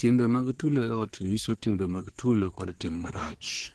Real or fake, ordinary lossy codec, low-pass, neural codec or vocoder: fake; Opus, 16 kbps; 10.8 kHz; codec, 24 kHz, 1 kbps, SNAC